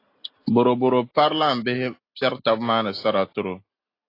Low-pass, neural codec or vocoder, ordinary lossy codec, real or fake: 5.4 kHz; none; AAC, 32 kbps; real